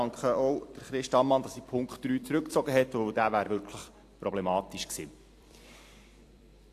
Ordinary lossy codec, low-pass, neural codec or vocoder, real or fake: AAC, 64 kbps; 14.4 kHz; vocoder, 44.1 kHz, 128 mel bands every 256 samples, BigVGAN v2; fake